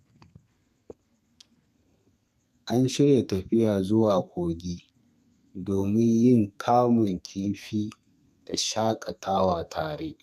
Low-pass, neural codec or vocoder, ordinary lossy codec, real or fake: 14.4 kHz; codec, 32 kHz, 1.9 kbps, SNAC; none; fake